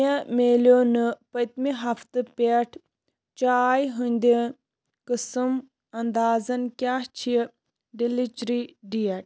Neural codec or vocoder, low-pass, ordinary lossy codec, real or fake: none; none; none; real